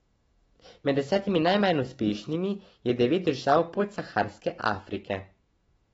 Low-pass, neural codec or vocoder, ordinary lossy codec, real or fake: 19.8 kHz; autoencoder, 48 kHz, 128 numbers a frame, DAC-VAE, trained on Japanese speech; AAC, 24 kbps; fake